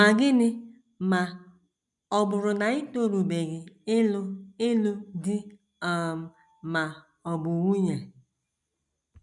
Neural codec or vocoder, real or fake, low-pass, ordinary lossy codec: none; real; 10.8 kHz; none